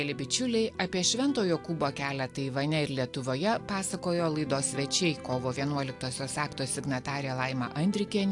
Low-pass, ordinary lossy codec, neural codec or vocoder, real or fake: 10.8 kHz; AAC, 64 kbps; none; real